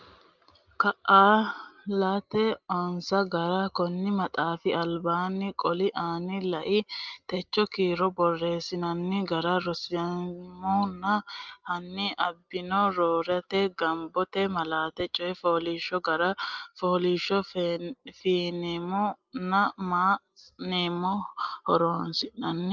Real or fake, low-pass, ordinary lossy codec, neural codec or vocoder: real; 7.2 kHz; Opus, 32 kbps; none